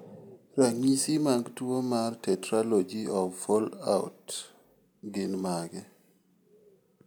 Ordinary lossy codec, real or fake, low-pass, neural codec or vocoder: none; real; none; none